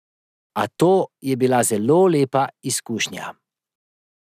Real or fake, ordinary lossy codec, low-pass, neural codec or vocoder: real; none; 14.4 kHz; none